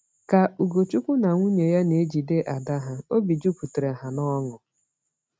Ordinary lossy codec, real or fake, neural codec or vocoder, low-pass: none; real; none; none